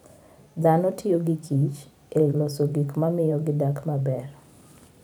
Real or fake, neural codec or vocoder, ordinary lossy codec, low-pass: fake; vocoder, 44.1 kHz, 128 mel bands every 512 samples, BigVGAN v2; none; 19.8 kHz